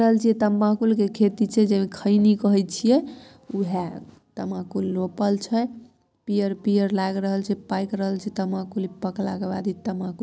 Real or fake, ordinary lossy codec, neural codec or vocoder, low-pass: real; none; none; none